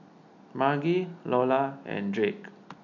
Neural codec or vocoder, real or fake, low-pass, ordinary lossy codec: none; real; 7.2 kHz; none